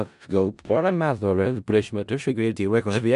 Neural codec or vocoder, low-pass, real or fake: codec, 16 kHz in and 24 kHz out, 0.4 kbps, LongCat-Audio-Codec, four codebook decoder; 10.8 kHz; fake